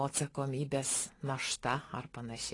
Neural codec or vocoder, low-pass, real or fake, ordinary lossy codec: none; 10.8 kHz; real; AAC, 32 kbps